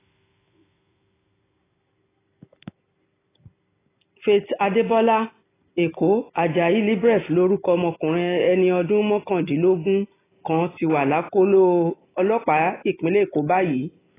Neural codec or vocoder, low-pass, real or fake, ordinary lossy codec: none; 3.6 kHz; real; AAC, 16 kbps